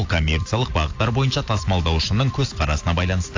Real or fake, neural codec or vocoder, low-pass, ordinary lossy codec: real; none; 7.2 kHz; AAC, 48 kbps